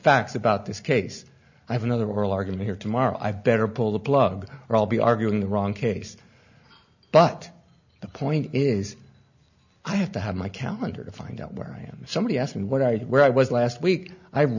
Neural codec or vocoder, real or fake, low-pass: none; real; 7.2 kHz